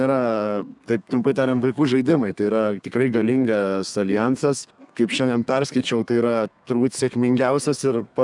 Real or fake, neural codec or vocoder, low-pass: fake; codec, 32 kHz, 1.9 kbps, SNAC; 10.8 kHz